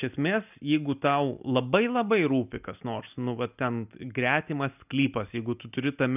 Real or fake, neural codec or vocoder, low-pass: real; none; 3.6 kHz